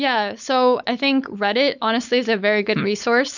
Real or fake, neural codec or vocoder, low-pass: fake; codec, 16 kHz, 4.8 kbps, FACodec; 7.2 kHz